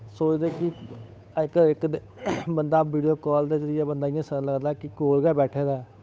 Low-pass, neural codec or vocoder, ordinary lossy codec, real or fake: none; codec, 16 kHz, 8 kbps, FunCodec, trained on Chinese and English, 25 frames a second; none; fake